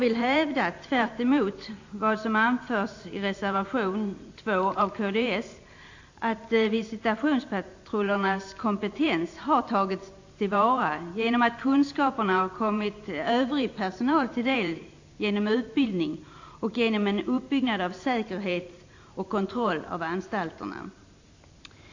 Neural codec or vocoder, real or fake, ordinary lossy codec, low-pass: vocoder, 44.1 kHz, 128 mel bands every 512 samples, BigVGAN v2; fake; AAC, 48 kbps; 7.2 kHz